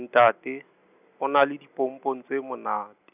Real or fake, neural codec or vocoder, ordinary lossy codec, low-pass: real; none; none; 3.6 kHz